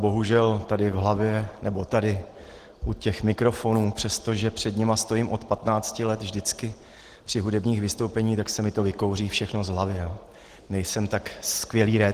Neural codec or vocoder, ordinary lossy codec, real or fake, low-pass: none; Opus, 16 kbps; real; 14.4 kHz